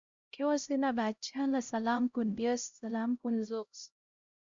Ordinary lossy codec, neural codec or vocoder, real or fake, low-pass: Opus, 64 kbps; codec, 16 kHz, 0.5 kbps, X-Codec, HuBERT features, trained on LibriSpeech; fake; 7.2 kHz